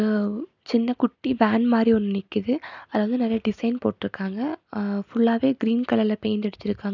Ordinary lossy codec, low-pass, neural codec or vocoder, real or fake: none; 7.2 kHz; none; real